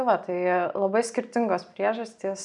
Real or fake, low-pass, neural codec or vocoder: real; 10.8 kHz; none